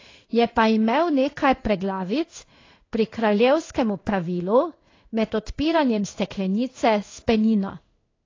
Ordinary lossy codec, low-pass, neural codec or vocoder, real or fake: AAC, 32 kbps; 7.2 kHz; codec, 16 kHz in and 24 kHz out, 1 kbps, XY-Tokenizer; fake